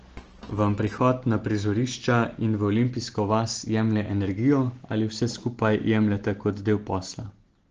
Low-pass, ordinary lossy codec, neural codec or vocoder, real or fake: 7.2 kHz; Opus, 16 kbps; none; real